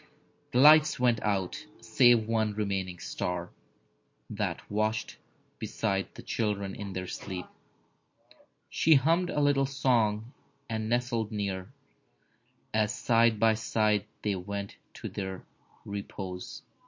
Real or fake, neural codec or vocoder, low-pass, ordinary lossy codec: real; none; 7.2 kHz; MP3, 48 kbps